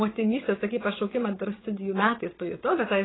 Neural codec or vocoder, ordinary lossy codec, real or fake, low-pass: vocoder, 22.05 kHz, 80 mel bands, WaveNeXt; AAC, 16 kbps; fake; 7.2 kHz